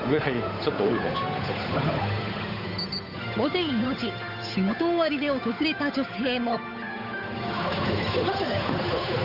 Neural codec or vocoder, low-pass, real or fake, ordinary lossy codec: codec, 16 kHz, 8 kbps, FunCodec, trained on Chinese and English, 25 frames a second; 5.4 kHz; fake; none